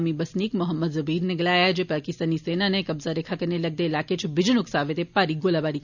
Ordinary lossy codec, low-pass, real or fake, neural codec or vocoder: none; 7.2 kHz; real; none